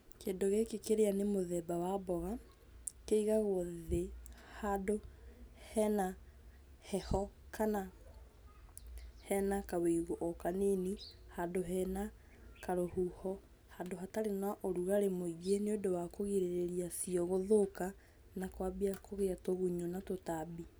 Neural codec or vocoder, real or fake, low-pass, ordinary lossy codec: none; real; none; none